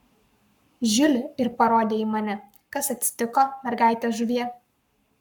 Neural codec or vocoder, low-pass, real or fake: codec, 44.1 kHz, 7.8 kbps, Pupu-Codec; 19.8 kHz; fake